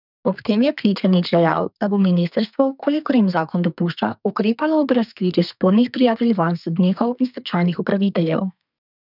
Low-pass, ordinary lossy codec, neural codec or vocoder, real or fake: 5.4 kHz; none; codec, 32 kHz, 1.9 kbps, SNAC; fake